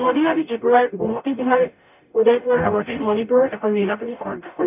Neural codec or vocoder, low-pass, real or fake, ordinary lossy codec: codec, 44.1 kHz, 0.9 kbps, DAC; 3.6 kHz; fake; none